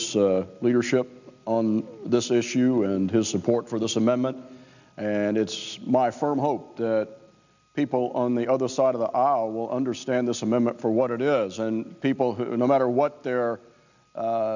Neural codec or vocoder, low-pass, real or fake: none; 7.2 kHz; real